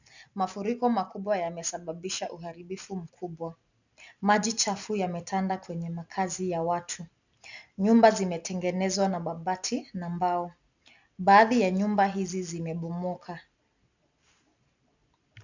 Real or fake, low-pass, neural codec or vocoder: real; 7.2 kHz; none